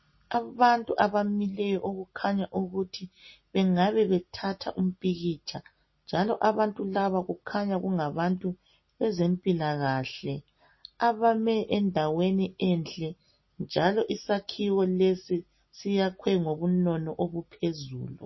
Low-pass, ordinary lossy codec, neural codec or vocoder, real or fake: 7.2 kHz; MP3, 24 kbps; none; real